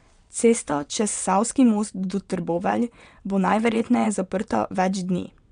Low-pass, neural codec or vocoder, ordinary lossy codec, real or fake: 9.9 kHz; vocoder, 22.05 kHz, 80 mel bands, WaveNeXt; MP3, 96 kbps; fake